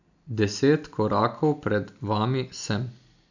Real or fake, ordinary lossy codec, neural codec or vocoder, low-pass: real; none; none; 7.2 kHz